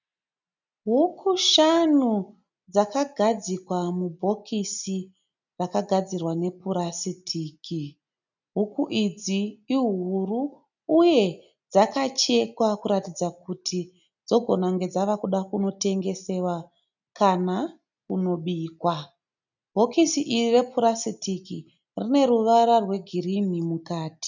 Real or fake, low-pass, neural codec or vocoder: real; 7.2 kHz; none